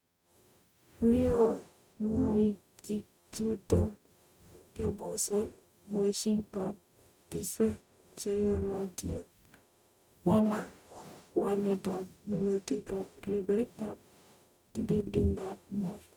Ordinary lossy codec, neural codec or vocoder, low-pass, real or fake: none; codec, 44.1 kHz, 0.9 kbps, DAC; 19.8 kHz; fake